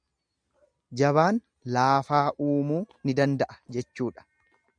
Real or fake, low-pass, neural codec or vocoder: real; 9.9 kHz; none